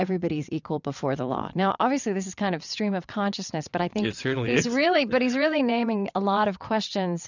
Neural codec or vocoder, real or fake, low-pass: vocoder, 22.05 kHz, 80 mel bands, WaveNeXt; fake; 7.2 kHz